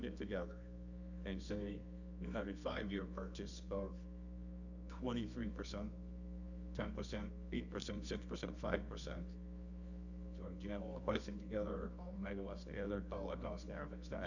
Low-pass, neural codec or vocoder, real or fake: 7.2 kHz; codec, 24 kHz, 0.9 kbps, WavTokenizer, medium music audio release; fake